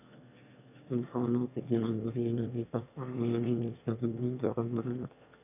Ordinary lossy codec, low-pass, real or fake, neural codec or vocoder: none; 3.6 kHz; fake; autoencoder, 22.05 kHz, a latent of 192 numbers a frame, VITS, trained on one speaker